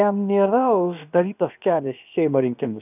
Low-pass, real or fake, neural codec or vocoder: 3.6 kHz; fake; codec, 16 kHz, about 1 kbps, DyCAST, with the encoder's durations